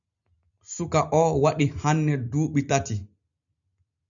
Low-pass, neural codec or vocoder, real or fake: 7.2 kHz; none; real